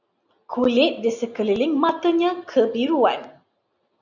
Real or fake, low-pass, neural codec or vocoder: real; 7.2 kHz; none